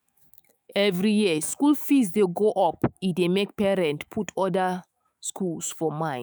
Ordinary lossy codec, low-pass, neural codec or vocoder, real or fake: none; none; autoencoder, 48 kHz, 128 numbers a frame, DAC-VAE, trained on Japanese speech; fake